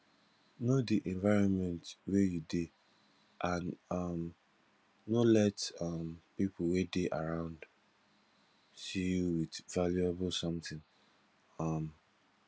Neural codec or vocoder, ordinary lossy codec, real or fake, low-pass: none; none; real; none